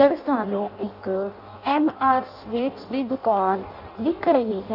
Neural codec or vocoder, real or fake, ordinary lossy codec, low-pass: codec, 16 kHz in and 24 kHz out, 0.6 kbps, FireRedTTS-2 codec; fake; none; 5.4 kHz